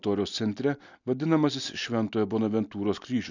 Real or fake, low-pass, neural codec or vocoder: real; 7.2 kHz; none